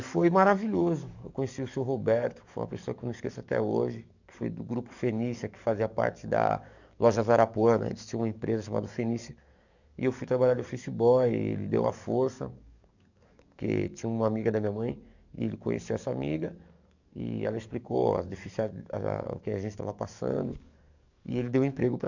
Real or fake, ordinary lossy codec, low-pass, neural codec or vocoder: fake; none; 7.2 kHz; codec, 44.1 kHz, 7.8 kbps, DAC